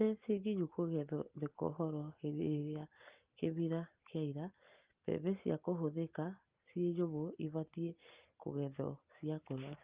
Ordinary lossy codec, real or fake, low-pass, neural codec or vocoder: Opus, 24 kbps; real; 3.6 kHz; none